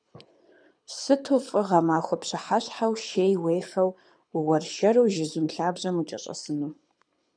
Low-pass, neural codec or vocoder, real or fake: 9.9 kHz; codec, 24 kHz, 6 kbps, HILCodec; fake